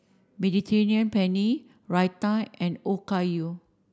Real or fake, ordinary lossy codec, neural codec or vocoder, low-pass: real; none; none; none